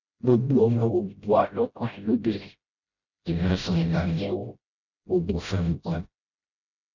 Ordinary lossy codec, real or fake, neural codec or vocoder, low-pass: none; fake; codec, 16 kHz, 0.5 kbps, FreqCodec, smaller model; 7.2 kHz